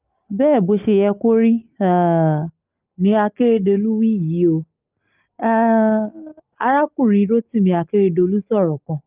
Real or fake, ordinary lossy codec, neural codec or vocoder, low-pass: real; Opus, 24 kbps; none; 3.6 kHz